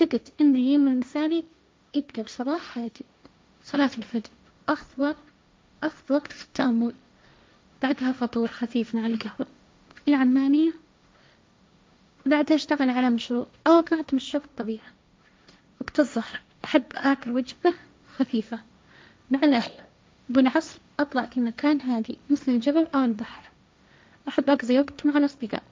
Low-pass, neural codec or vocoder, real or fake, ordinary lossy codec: none; codec, 16 kHz, 1.1 kbps, Voila-Tokenizer; fake; none